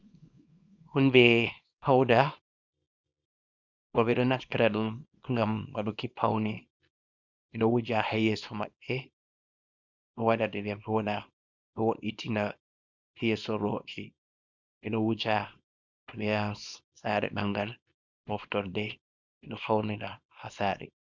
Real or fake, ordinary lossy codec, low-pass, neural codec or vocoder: fake; Opus, 64 kbps; 7.2 kHz; codec, 24 kHz, 0.9 kbps, WavTokenizer, small release